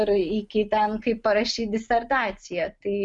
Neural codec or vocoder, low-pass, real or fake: none; 10.8 kHz; real